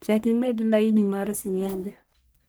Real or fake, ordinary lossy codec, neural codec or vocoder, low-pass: fake; none; codec, 44.1 kHz, 1.7 kbps, Pupu-Codec; none